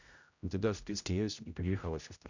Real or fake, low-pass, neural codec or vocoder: fake; 7.2 kHz; codec, 16 kHz, 0.5 kbps, X-Codec, HuBERT features, trained on general audio